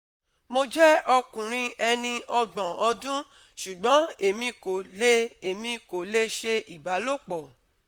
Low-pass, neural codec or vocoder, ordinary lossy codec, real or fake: 19.8 kHz; vocoder, 44.1 kHz, 128 mel bands, Pupu-Vocoder; Opus, 64 kbps; fake